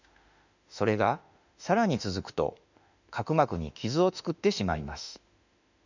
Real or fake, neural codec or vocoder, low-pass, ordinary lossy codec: fake; autoencoder, 48 kHz, 32 numbers a frame, DAC-VAE, trained on Japanese speech; 7.2 kHz; none